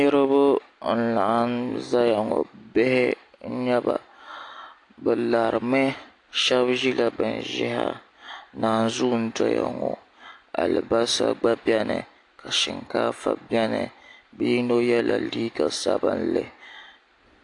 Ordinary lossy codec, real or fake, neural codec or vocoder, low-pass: AAC, 48 kbps; real; none; 10.8 kHz